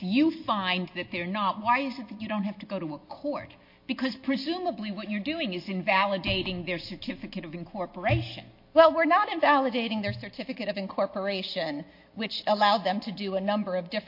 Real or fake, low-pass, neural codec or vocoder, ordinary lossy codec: real; 5.4 kHz; none; MP3, 32 kbps